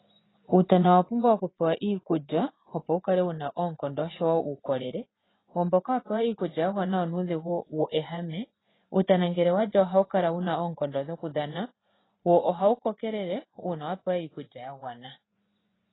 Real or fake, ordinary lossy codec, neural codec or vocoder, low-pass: fake; AAC, 16 kbps; vocoder, 24 kHz, 100 mel bands, Vocos; 7.2 kHz